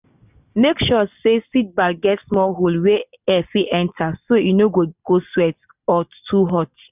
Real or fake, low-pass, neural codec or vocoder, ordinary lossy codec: real; 3.6 kHz; none; none